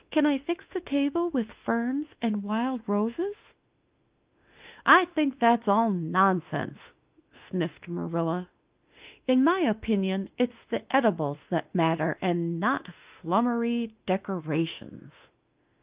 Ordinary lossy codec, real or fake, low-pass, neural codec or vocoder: Opus, 32 kbps; fake; 3.6 kHz; autoencoder, 48 kHz, 32 numbers a frame, DAC-VAE, trained on Japanese speech